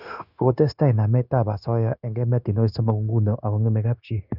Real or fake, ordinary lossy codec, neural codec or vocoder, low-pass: fake; none; codec, 16 kHz, 0.9 kbps, LongCat-Audio-Codec; 5.4 kHz